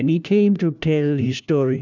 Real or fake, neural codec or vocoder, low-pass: fake; codec, 16 kHz, 1 kbps, FunCodec, trained on LibriTTS, 50 frames a second; 7.2 kHz